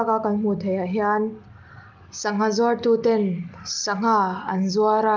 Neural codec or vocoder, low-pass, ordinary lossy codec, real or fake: none; 7.2 kHz; Opus, 32 kbps; real